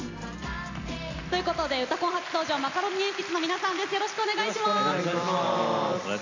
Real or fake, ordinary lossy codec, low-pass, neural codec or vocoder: real; AAC, 48 kbps; 7.2 kHz; none